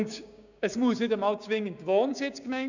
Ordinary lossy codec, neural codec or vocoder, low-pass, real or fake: none; codec, 16 kHz, 6 kbps, DAC; 7.2 kHz; fake